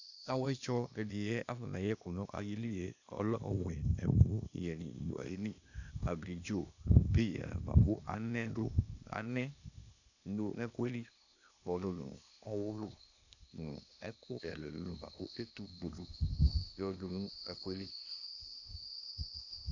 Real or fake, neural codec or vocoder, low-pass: fake; codec, 16 kHz, 0.8 kbps, ZipCodec; 7.2 kHz